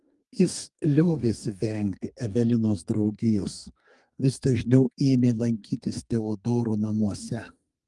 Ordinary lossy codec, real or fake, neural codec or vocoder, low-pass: Opus, 32 kbps; fake; codec, 32 kHz, 1.9 kbps, SNAC; 10.8 kHz